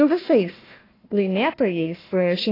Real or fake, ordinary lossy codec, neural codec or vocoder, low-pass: fake; AAC, 24 kbps; codec, 16 kHz, 1 kbps, FunCodec, trained on Chinese and English, 50 frames a second; 5.4 kHz